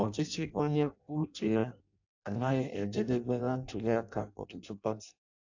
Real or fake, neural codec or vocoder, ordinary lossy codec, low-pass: fake; codec, 16 kHz in and 24 kHz out, 0.6 kbps, FireRedTTS-2 codec; AAC, 48 kbps; 7.2 kHz